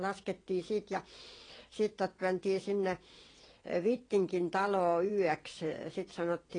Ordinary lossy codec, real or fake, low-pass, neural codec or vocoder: AAC, 32 kbps; fake; 9.9 kHz; vocoder, 22.05 kHz, 80 mel bands, WaveNeXt